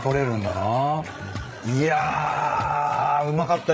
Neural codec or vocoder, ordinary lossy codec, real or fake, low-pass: codec, 16 kHz, 16 kbps, FreqCodec, larger model; none; fake; none